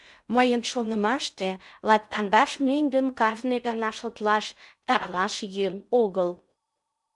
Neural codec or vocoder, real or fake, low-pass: codec, 16 kHz in and 24 kHz out, 0.6 kbps, FocalCodec, streaming, 2048 codes; fake; 10.8 kHz